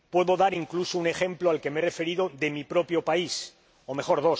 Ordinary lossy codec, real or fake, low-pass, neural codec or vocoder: none; real; none; none